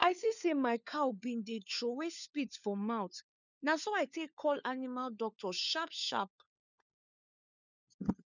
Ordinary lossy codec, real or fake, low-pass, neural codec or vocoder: none; fake; 7.2 kHz; codec, 16 kHz, 4 kbps, FunCodec, trained on LibriTTS, 50 frames a second